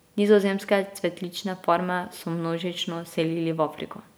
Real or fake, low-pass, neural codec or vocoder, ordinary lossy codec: real; none; none; none